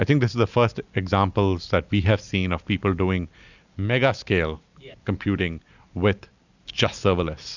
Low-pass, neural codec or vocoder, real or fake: 7.2 kHz; none; real